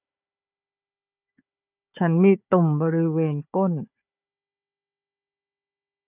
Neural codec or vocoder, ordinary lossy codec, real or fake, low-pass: codec, 16 kHz, 4 kbps, FunCodec, trained on Chinese and English, 50 frames a second; none; fake; 3.6 kHz